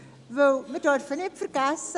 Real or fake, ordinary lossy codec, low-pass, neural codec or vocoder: real; MP3, 96 kbps; 10.8 kHz; none